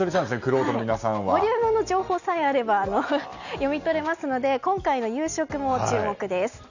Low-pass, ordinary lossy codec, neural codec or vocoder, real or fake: 7.2 kHz; none; none; real